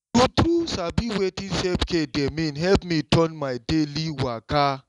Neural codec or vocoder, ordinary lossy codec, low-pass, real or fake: none; none; 10.8 kHz; real